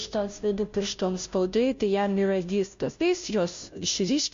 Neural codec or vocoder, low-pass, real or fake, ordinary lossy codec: codec, 16 kHz, 0.5 kbps, FunCodec, trained on Chinese and English, 25 frames a second; 7.2 kHz; fake; MP3, 48 kbps